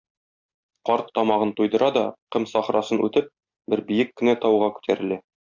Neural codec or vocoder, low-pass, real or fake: none; 7.2 kHz; real